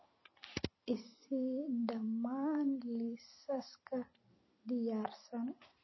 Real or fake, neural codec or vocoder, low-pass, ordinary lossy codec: fake; vocoder, 44.1 kHz, 128 mel bands every 256 samples, BigVGAN v2; 7.2 kHz; MP3, 24 kbps